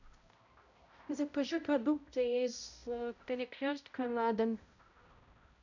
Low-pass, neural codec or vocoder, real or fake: 7.2 kHz; codec, 16 kHz, 0.5 kbps, X-Codec, HuBERT features, trained on balanced general audio; fake